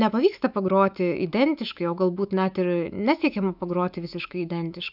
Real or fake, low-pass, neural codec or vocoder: fake; 5.4 kHz; autoencoder, 48 kHz, 128 numbers a frame, DAC-VAE, trained on Japanese speech